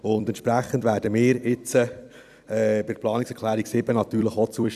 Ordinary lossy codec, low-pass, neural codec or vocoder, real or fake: none; 14.4 kHz; vocoder, 44.1 kHz, 128 mel bands every 256 samples, BigVGAN v2; fake